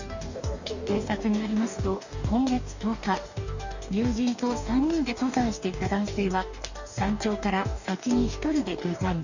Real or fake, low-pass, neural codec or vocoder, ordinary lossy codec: fake; 7.2 kHz; codec, 44.1 kHz, 2.6 kbps, DAC; none